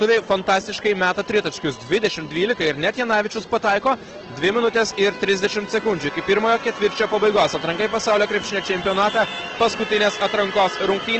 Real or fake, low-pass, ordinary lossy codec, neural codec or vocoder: real; 7.2 kHz; Opus, 16 kbps; none